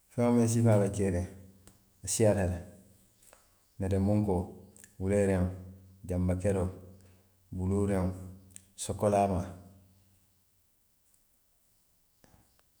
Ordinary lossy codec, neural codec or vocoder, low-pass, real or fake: none; autoencoder, 48 kHz, 128 numbers a frame, DAC-VAE, trained on Japanese speech; none; fake